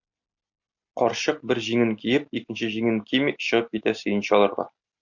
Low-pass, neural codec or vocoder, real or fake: 7.2 kHz; none; real